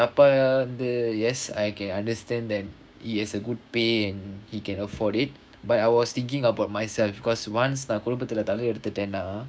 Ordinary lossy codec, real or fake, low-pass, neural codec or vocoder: none; fake; none; codec, 16 kHz, 6 kbps, DAC